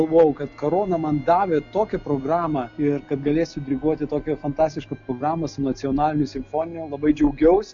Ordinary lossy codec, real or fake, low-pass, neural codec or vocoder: MP3, 48 kbps; real; 7.2 kHz; none